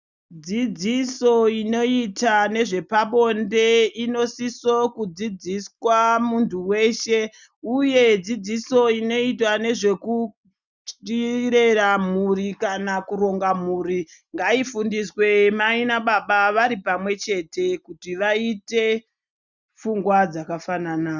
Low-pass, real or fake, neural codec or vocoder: 7.2 kHz; real; none